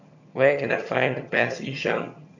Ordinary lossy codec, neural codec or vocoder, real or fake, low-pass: none; vocoder, 22.05 kHz, 80 mel bands, HiFi-GAN; fake; 7.2 kHz